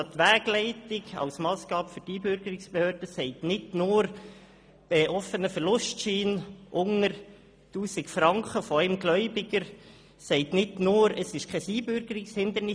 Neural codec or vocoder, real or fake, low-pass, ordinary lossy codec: none; real; none; none